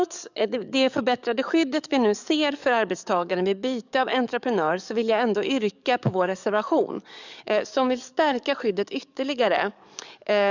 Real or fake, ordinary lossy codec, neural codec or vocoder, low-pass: fake; none; codec, 44.1 kHz, 7.8 kbps, DAC; 7.2 kHz